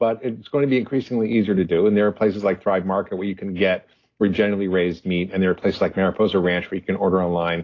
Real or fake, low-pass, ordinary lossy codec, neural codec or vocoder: real; 7.2 kHz; AAC, 32 kbps; none